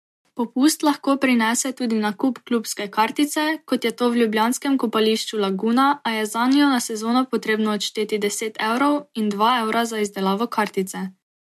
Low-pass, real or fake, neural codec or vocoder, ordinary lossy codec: 14.4 kHz; real; none; MP3, 64 kbps